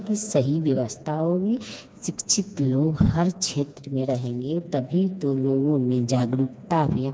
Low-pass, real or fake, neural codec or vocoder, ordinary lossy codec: none; fake; codec, 16 kHz, 2 kbps, FreqCodec, smaller model; none